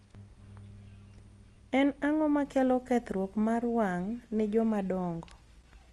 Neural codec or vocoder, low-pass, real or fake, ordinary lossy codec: none; 10.8 kHz; real; Opus, 32 kbps